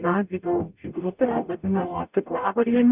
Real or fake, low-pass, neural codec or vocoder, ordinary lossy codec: fake; 3.6 kHz; codec, 44.1 kHz, 0.9 kbps, DAC; Opus, 64 kbps